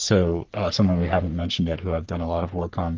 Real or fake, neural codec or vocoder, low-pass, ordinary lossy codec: fake; codec, 44.1 kHz, 3.4 kbps, Pupu-Codec; 7.2 kHz; Opus, 24 kbps